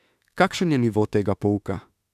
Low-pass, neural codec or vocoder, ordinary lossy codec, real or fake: 14.4 kHz; autoencoder, 48 kHz, 32 numbers a frame, DAC-VAE, trained on Japanese speech; none; fake